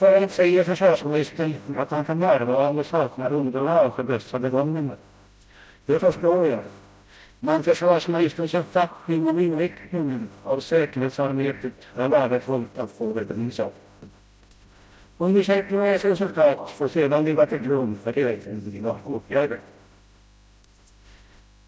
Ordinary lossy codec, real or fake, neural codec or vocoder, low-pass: none; fake; codec, 16 kHz, 0.5 kbps, FreqCodec, smaller model; none